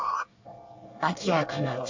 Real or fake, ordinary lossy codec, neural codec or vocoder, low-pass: fake; AAC, 48 kbps; codec, 24 kHz, 1 kbps, SNAC; 7.2 kHz